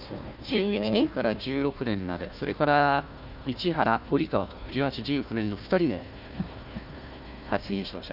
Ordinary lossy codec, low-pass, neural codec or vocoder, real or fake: none; 5.4 kHz; codec, 16 kHz, 1 kbps, FunCodec, trained on Chinese and English, 50 frames a second; fake